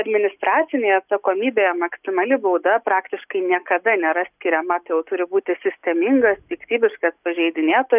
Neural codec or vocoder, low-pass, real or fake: none; 3.6 kHz; real